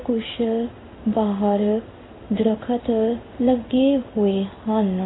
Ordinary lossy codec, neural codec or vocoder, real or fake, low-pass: AAC, 16 kbps; codec, 16 kHz in and 24 kHz out, 1 kbps, XY-Tokenizer; fake; 7.2 kHz